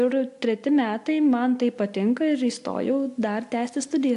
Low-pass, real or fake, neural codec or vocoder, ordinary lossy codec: 10.8 kHz; real; none; AAC, 64 kbps